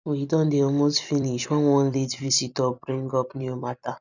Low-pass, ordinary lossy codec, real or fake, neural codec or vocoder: 7.2 kHz; none; fake; vocoder, 44.1 kHz, 128 mel bands every 256 samples, BigVGAN v2